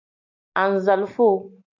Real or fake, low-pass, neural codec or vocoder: real; 7.2 kHz; none